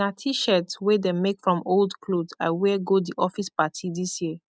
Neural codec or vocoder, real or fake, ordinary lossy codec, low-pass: none; real; none; none